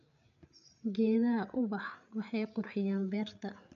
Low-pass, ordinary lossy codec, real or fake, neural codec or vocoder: 7.2 kHz; none; fake; codec, 16 kHz, 8 kbps, FreqCodec, larger model